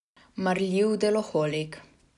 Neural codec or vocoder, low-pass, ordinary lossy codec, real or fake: none; 10.8 kHz; none; real